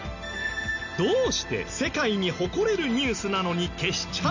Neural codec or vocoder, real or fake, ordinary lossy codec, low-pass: none; real; none; 7.2 kHz